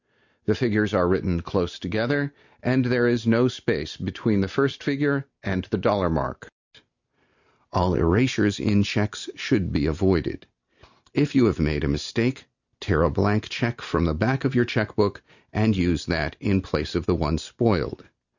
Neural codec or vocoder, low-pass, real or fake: none; 7.2 kHz; real